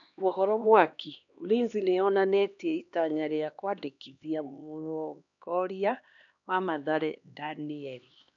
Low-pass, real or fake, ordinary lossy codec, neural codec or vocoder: 7.2 kHz; fake; none; codec, 16 kHz, 2 kbps, X-Codec, HuBERT features, trained on LibriSpeech